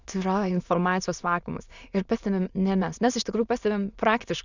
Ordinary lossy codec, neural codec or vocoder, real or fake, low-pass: Opus, 64 kbps; autoencoder, 22.05 kHz, a latent of 192 numbers a frame, VITS, trained on many speakers; fake; 7.2 kHz